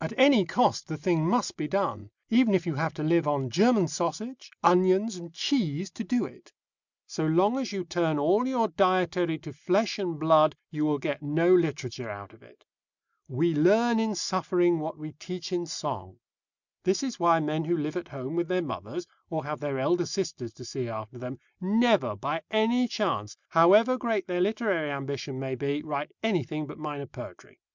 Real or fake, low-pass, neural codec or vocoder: real; 7.2 kHz; none